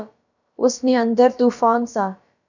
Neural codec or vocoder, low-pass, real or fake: codec, 16 kHz, about 1 kbps, DyCAST, with the encoder's durations; 7.2 kHz; fake